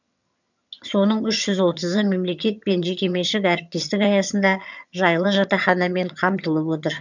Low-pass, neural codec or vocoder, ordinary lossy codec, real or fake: 7.2 kHz; vocoder, 22.05 kHz, 80 mel bands, HiFi-GAN; none; fake